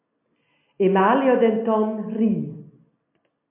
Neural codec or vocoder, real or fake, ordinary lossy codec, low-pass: none; real; MP3, 32 kbps; 3.6 kHz